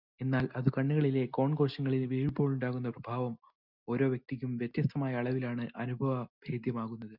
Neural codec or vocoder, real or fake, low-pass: none; real; 5.4 kHz